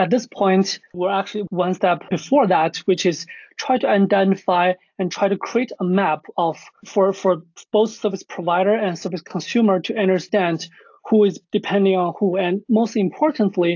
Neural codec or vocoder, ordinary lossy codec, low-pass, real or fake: none; AAC, 48 kbps; 7.2 kHz; real